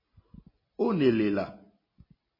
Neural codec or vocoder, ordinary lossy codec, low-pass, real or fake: none; MP3, 24 kbps; 5.4 kHz; real